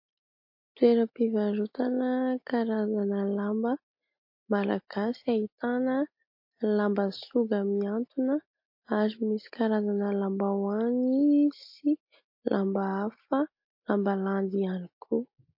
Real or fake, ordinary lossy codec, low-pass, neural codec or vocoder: real; MP3, 32 kbps; 5.4 kHz; none